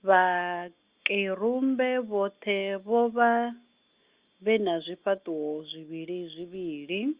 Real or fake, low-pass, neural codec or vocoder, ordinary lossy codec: real; 3.6 kHz; none; Opus, 32 kbps